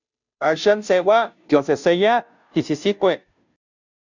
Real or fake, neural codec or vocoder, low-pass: fake; codec, 16 kHz, 0.5 kbps, FunCodec, trained on Chinese and English, 25 frames a second; 7.2 kHz